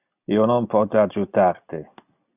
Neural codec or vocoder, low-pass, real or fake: none; 3.6 kHz; real